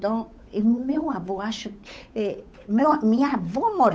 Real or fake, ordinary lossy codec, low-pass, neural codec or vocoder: fake; none; none; codec, 16 kHz, 8 kbps, FunCodec, trained on Chinese and English, 25 frames a second